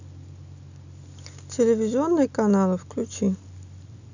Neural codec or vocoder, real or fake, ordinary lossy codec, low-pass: none; real; none; 7.2 kHz